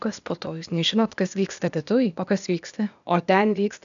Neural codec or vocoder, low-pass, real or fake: codec, 16 kHz, 0.8 kbps, ZipCodec; 7.2 kHz; fake